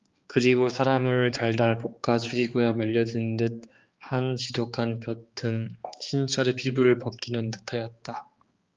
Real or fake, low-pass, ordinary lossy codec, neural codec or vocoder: fake; 7.2 kHz; Opus, 32 kbps; codec, 16 kHz, 2 kbps, X-Codec, HuBERT features, trained on balanced general audio